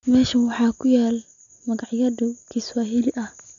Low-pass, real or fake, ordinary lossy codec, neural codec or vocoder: 7.2 kHz; real; none; none